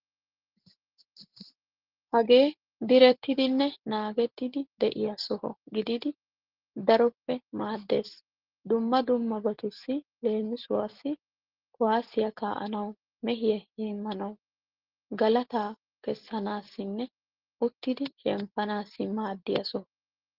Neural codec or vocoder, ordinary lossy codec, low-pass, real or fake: none; Opus, 16 kbps; 5.4 kHz; real